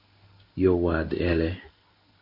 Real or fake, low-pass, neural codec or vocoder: fake; 5.4 kHz; codec, 16 kHz in and 24 kHz out, 1 kbps, XY-Tokenizer